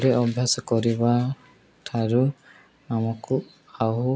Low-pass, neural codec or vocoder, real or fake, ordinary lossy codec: none; none; real; none